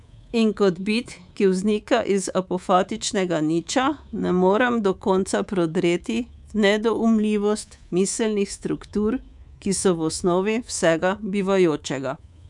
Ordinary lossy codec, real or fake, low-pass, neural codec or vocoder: none; fake; 10.8 kHz; codec, 24 kHz, 3.1 kbps, DualCodec